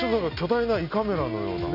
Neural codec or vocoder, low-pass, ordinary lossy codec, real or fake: none; 5.4 kHz; none; real